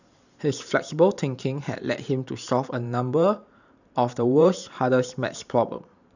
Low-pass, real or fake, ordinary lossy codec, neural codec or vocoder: 7.2 kHz; fake; none; vocoder, 44.1 kHz, 128 mel bands every 512 samples, BigVGAN v2